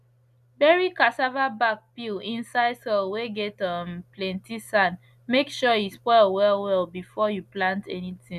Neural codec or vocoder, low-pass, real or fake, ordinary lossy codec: none; 14.4 kHz; real; none